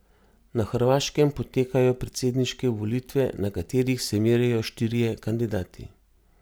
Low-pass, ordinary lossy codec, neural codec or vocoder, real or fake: none; none; none; real